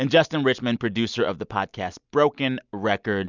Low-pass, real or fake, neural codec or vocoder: 7.2 kHz; real; none